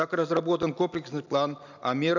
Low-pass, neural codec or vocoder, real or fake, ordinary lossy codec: 7.2 kHz; none; real; none